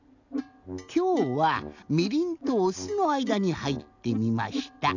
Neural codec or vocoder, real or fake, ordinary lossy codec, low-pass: none; real; none; 7.2 kHz